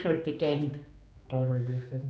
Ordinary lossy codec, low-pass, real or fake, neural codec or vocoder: none; none; fake; codec, 16 kHz, 2 kbps, X-Codec, HuBERT features, trained on general audio